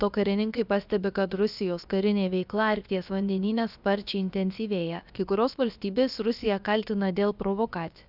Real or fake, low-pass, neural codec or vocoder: fake; 5.4 kHz; codec, 16 kHz, about 1 kbps, DyCAST, with the encoder's durations